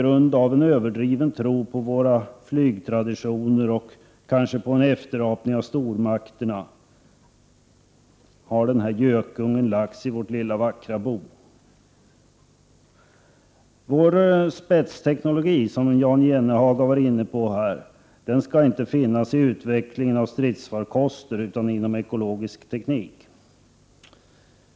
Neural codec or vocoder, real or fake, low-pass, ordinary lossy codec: none; real; none; none